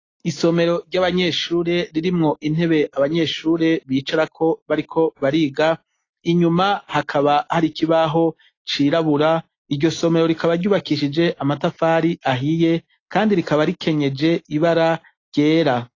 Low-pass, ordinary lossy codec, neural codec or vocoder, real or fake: 7.2 kHz; AAC, 32 kbps; none; real